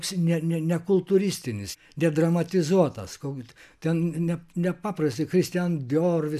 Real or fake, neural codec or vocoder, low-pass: real; none; 14.4 kHz